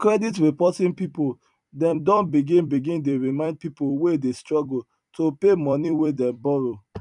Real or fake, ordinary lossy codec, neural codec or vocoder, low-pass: fake; none; vocoder, 44.1 kHz, 128 mel bands every 256 samples, BigVGAN v2; 10.8 kHz